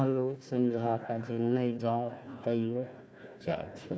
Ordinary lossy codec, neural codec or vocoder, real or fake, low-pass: none; codec, 16 kHz, 1 kbps, FunCodec, trained on Chinese and English, 50 frames a second; fake; none